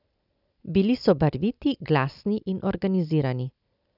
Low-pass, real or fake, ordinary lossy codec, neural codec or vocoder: 5.4 kHz; real; none; none